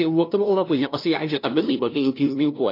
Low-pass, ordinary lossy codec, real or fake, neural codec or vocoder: 5.4 kHz; AAC, 32 kbps; fake; codec, 16 kHz, 0.5 kbps, FunCodec, trained on LibriTTS, 25 frames a second